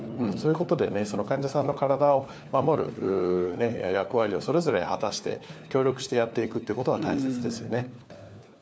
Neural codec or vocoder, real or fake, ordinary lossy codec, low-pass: codec, 16 kHz, 4 kbps, FunCodec, trained on LibriTTS, 50 frames a second; fake; none; none